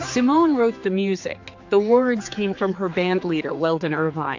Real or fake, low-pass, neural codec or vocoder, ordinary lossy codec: fake; 7.2 kHz; codec, 16 kHz, 4 kbps, X-Codec, HuBERT features, trained on general audio; AAC, 48 kbps